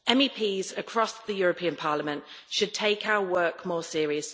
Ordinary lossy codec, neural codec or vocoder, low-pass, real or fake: none; none; none; real